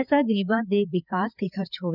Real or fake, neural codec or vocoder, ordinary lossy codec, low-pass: fake; codec, 16 kHz, 4 kbps, FreqCodec, larger model; none; 5.4 kHz